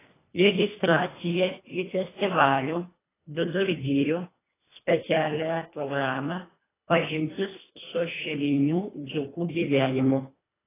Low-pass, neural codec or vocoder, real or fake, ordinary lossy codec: 3.6 kHz; codec, 24 kHz, 1.5 kbps, HILCodec; fake; AAC, 16 kbps